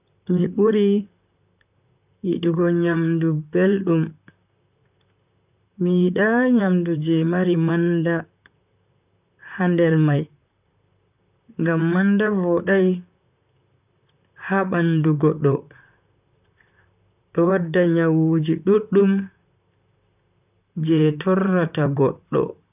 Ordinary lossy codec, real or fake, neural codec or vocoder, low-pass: none; fake; vocoder, 44.1 kHz, 128 mel bands, Pupu-Vocoder; 3.6 kHz